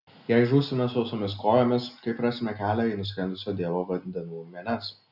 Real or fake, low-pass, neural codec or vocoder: real; 5.4 kHz; none